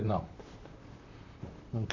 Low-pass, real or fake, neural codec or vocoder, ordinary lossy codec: 7.2 kHz; fake; vocoder, 44.1 kHz, 128 mel bands, Pupu-Vocoder; AAC, 48 kbps